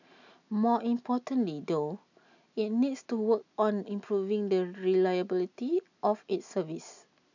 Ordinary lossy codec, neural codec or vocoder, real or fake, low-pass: none; none; real; 7.2 kHz